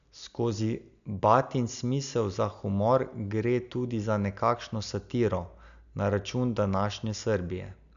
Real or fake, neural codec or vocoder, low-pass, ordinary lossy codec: real; none; 7.2 kHz; none